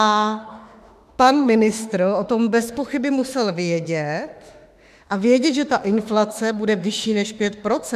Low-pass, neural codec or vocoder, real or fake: 14.4 kHz; autoencoder, 48 kHz, 32 numbers a frame, DAC-VAE, trained on Japanese speech; fake